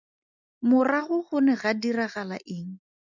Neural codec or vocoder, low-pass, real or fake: none; 7.2 kHz; real